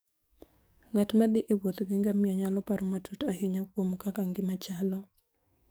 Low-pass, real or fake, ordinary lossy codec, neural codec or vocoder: none; fake; none; codec, 44.1 kHz, 7.8 kbps, DAC